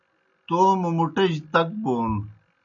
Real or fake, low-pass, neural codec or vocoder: real; 7.2 kHz; none